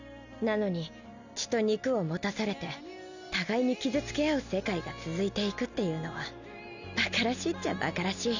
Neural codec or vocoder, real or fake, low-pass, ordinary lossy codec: none; real; 7.2 kHz; none